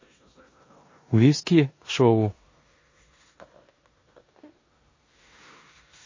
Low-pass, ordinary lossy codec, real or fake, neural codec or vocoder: 7.2 kHz; MP3, 32 kbps; fake; codec, 16 kHz in and 24 kHz out, 0.9 kbps, LongCat-Audio-Codec, four codebook decoder